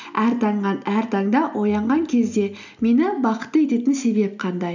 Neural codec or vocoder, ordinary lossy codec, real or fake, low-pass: none; none; real; 7.2 kHz